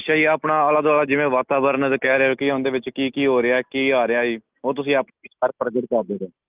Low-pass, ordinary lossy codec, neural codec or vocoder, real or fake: 3.6 kHz; Opus, 32 kbps; none; real